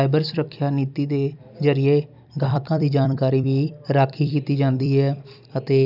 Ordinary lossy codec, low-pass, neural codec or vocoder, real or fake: none; 5.4 kHz; vocoder, 44.1 kHz, 128 mel bands every 256 samples, BigVGAN v2; fake